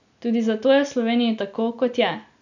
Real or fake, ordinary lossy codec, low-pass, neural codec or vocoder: real; none; 7.2 kHz; none